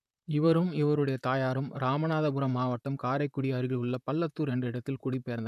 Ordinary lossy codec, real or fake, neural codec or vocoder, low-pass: none; fake; vocoder, 44.1 kHz, 128 mel bands, Pupu-Vocoder; 14.4 kHz